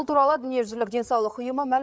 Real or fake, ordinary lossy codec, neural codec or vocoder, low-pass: fake; none; codec, 16 kHz, 8 kbps, FreqCodec, larger model; none